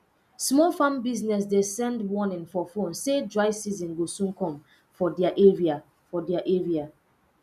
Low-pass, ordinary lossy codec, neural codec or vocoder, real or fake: 14.4 kHz; none; none; real